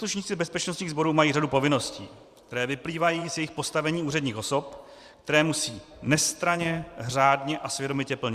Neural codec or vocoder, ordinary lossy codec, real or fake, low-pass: vocoder, 44.1 kHz, 128 mel bands every 512 samples, BigVGAN v2; Opus, 64 kbps; fake; 14.4 kHz